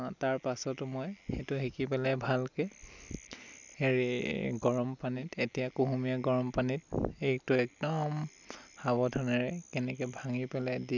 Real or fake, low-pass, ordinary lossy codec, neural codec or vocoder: real; 7.2 kHz; none; none